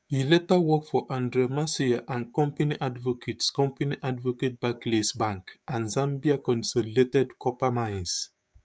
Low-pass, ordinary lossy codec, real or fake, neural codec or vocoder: none; none; fake; codec, 16 kHz, 6 kbps, DAC